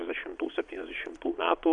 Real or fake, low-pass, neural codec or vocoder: fake; 9.9 kHz; vocoder, 22.05 kHz, 80 mel bands, Vocos